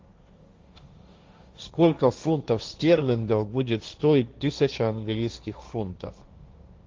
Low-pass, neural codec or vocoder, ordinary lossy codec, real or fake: 7.2 kHz; codec, 16 kHz, 1.1 kbps, Voila-Tokenizer; Opus, 32 kbps; fake